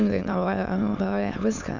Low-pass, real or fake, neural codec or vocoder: 7.2 kHz; fake; autoencoder, 22.05 kHz, a latent of 192 numbers a frame, VITS, trained on many speakers